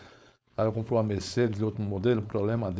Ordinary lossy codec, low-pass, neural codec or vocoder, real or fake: none; none; codec, 16 kHz, 4.8 kbps, FACodec; fake